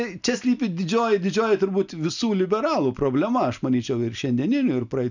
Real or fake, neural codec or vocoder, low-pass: real; none; 7.2 kHz